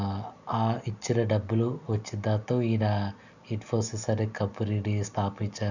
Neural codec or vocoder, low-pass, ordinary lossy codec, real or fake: none; 7.2 kHz; none; real